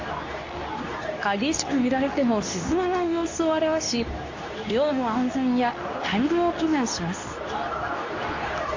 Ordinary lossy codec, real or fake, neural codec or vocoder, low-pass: AAC, 48 kbps; fake; codec, 24 kHz, 0.9 kbps, WavTokenizer, medium speech release version 2; 7.2 kHz